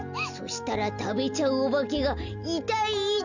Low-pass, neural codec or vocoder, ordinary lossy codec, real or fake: 7.2 kHz; none; none; real